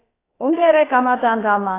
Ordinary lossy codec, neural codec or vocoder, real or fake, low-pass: AAC, 16 kbps; codec, 16 kHz, about 1 kbps, DyCAST, with the encoder's durations; fake; 3.6 kHz